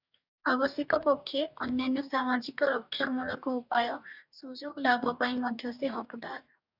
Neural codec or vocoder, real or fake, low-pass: codec, 44.1 kHz, 2.6 kbps, DAC; fake; 5.4 kHz